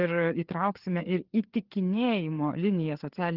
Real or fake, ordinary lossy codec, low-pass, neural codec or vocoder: fake; Opus, 16 kbps; 5.4 kHz; codec, 16 kHz, 4 kbps, FreqCodec, larger model